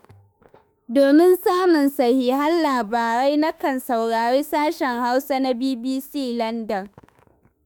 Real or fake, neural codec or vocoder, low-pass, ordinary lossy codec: fake; autoencoder, 48 kHz, 32 numbers a frame, DAC-VAE, trained on Japanese speech; none; none